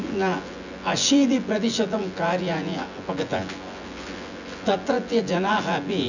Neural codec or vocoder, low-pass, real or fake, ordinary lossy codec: vocoder, 24 kHz, 100 mel bands, Vocos; 7.2 kHz; fake; none